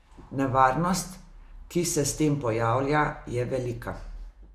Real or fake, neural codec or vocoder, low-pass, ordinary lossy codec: real; none; 14.4 kHz; Opus, 64 kbps